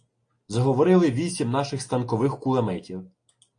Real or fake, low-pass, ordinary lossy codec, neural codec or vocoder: real; 9.9 kHz; AAC, 48 kbps; none